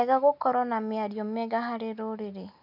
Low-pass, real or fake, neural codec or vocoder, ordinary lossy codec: 5.4 kHz; real; none; none